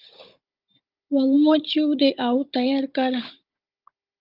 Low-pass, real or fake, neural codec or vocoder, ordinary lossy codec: 5.4 kHz; fake; codec, 16 kHz, 16 kbps, FunCodec, trained on Chinese and English, 50 frames a second; Opus, 32 kbps